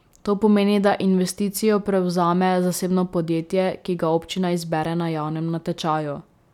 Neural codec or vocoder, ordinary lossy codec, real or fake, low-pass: none; none; real; 19.8 kHz